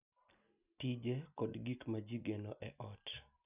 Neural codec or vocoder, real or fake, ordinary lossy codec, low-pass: none; real; none; 3.6 kHz